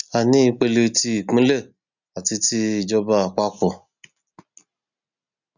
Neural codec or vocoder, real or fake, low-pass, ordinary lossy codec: none; real; 7.2 kHz; none